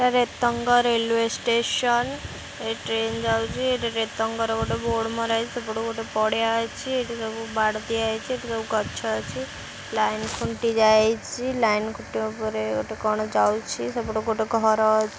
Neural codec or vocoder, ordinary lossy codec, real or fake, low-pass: none; none; real; none